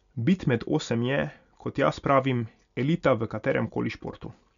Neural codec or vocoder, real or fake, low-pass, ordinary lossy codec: none; real; 7.2 kHz; none